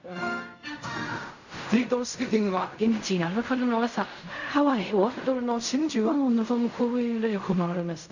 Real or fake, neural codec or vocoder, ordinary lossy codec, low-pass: fake; codec, 16 kHz in and 24 kHz out, 0.4 kbps, LongCat-Audio-Codec, fine tuned four codebook decoder; none; 7.2 kHz